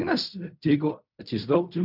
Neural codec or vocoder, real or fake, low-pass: codec, 16 kHz in and 24 kHz out, 0.4 kbps, LongCat-Audio-Codec, fine tuned four codebook decoder; fake; 5.4 kHz